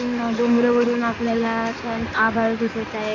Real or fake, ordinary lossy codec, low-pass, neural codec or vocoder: fake; none; 7.2 kHz; codec, 16 kHz in and 24 kHz out, 2.2 kbps, FireRedTTS-2 codec